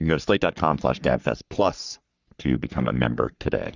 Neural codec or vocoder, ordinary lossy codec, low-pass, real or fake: codec, 44.1 kHz, 3.4 kbps, Pupu-Codec; Opus, 64 kbps; 7.2 kHz; fake